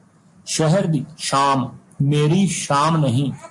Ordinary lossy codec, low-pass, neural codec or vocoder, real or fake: MP3, 48 kbps; 10.8 kHz; none; real